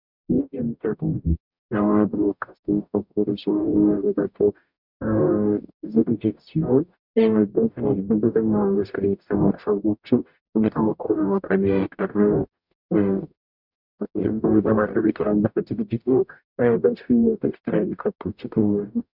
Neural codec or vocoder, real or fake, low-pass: codec, 44.1 kHz, 0.9 kbps, DAC; fake; 5.4 kHz